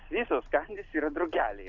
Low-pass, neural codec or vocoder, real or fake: 7.2 kHz; none; real